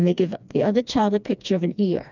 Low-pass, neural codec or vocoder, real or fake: 7.2 kHz; codec, 16 kHz, 2 kbps, FreqCodec, smaller model; fake